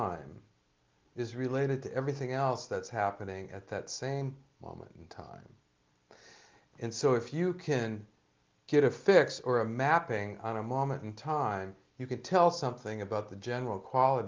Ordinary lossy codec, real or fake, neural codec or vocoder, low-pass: Opus, 32 kbps; real; none; 7.2 kHz